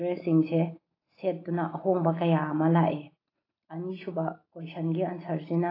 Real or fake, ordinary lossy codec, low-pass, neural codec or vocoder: real; AAC, 24 kbps; 5.4 kHz; none